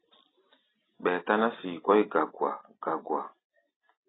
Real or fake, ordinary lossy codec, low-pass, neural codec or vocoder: real; AAC, 16 kbps; 7.2 kHz; none